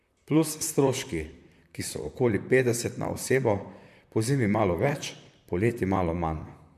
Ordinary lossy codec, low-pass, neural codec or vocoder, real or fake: MP3, 96 kbps; 14.4 kHz; vocoder, 44.1 kHz, 128 mel bands, Pupu-Vocoder; fake